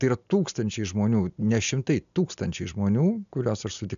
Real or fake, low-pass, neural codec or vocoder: real; 7.2 kHz; none